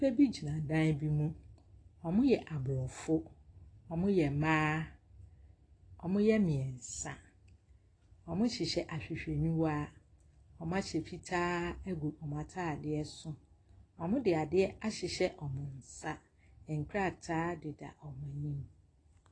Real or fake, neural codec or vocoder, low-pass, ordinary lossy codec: real; none; 9.9 kHz; AAC, 32 kbps